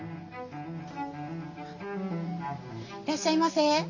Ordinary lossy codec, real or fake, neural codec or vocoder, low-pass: none; real; none; 7.2 kHz